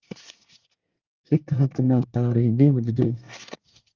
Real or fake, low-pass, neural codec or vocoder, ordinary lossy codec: fake; 7.2 kHz; codec, 24 kHz, 1 kbps, SNAC; Opus, 32 kbps